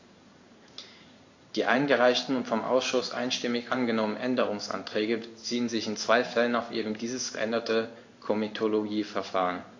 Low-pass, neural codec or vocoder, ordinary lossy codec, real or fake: 7.2 kHz; codec, 16 kHz in and 24 kHz out, 1 kbps, XY-Tokenizer; AAC, 48 kbps; fake